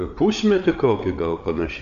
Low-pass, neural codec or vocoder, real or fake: 7.2 kHz; codec, 16 kHz, 8 kbps, FreqCodec, larger model; fake